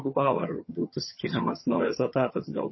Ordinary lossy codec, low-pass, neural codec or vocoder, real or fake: MP3, 24 kbps; 7.2 kHz; vocoder, 22.05 kHz, 80 mel bands, HiFi-GAN; fake